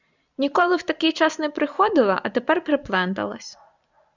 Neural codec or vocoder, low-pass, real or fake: vocoder, 44.1 kHz, 128 mel bands every 256 samples, BigVGAN v2; 7.2 kHz; fake